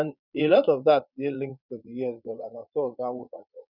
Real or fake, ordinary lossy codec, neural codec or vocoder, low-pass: fake; none; vocoder, 22.05 kHz, 80 mel bands, Vocos; 5.4 kHz